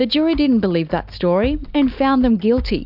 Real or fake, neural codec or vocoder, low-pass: real; none; 5.4 kHz